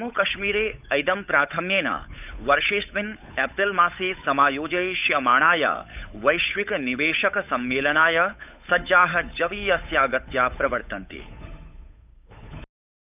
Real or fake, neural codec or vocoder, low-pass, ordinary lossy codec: fake; codec, 16 kHz, 8 kbps, FunCodec, trained on Chinese and English, 25 frames a second; 3.6 kHz; none